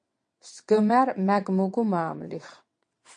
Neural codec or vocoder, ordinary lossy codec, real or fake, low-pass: vocoder, 22.05 kHz, 80 mel bands, WaveNeXt; MP3, 48 kbps; fake; 9.9 kHz